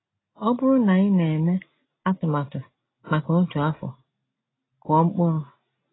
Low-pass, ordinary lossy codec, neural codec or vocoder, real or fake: 7.2 kHz; AAC, 16 kbps; none; real